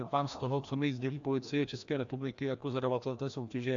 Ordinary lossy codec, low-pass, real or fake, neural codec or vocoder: AAC, 64 kbps; 7.2 kHz; fake; codec, 16 kHz, 1 kbps, FreqCodec, larger model